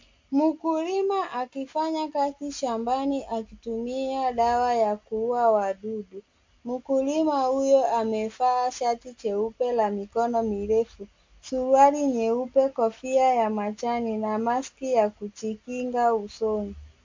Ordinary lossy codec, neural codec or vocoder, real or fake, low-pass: MP3, 48 kbps; none; real; 7.2 kHz